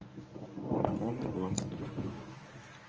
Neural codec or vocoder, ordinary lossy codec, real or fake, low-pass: codec, 24 kHz, 0.9 kbps, WavTokenizer, medium speech release version 1; Opus, 24 kbps; fake; 7.2 kHz